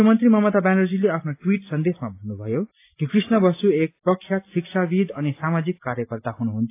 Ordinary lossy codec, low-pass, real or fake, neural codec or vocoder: AAC, 24 kbps; 3.6 kHz; real; none